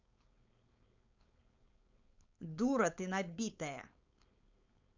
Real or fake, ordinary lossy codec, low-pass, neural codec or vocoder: fake; none; 7.2 kHz; codec, 16 kHz, 4.8 kbps, FACodec